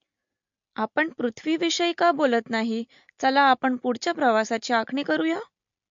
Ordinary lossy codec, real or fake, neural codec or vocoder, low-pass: MP3, 48 kbps; real; none; 7.2 kHz